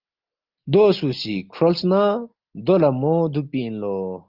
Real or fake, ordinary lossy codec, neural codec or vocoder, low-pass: real; Opus, 32 kbps; none; 5.4 kHz